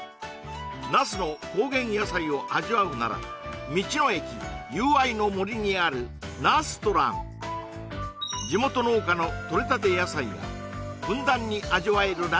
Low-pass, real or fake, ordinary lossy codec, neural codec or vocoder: none; real; none; none